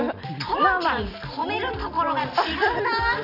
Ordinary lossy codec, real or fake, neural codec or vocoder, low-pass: none; real; none; 5.4 kHz